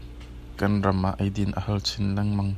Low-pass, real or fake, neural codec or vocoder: 14.4 kHz; real; none